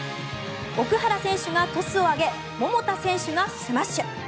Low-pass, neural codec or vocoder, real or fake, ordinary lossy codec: none; none; real; none